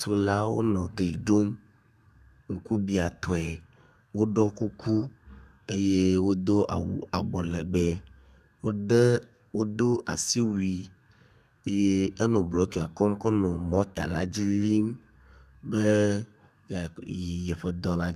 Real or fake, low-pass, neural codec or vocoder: fake; 14.4 kHz; codec, 32 kHz, 1.9 kbps, SNAC